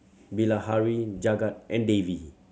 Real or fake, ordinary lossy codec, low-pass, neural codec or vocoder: real; none; none; none